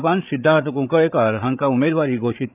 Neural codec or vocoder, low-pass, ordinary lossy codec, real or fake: codec, 16 kHz, 8 kbps, FreqCodec, larger model; 3.6 kHz; none; fake